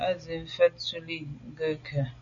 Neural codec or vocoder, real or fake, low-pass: none; real; 7.2 kHz